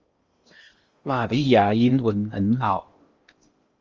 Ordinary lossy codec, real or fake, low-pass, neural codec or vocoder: Opus, 32 kbps; fake; 7.2 kHz; codec, 16 kHz in and 24 kHz out, 0.6 kbps, FocalCodec, streaming, 2048 codes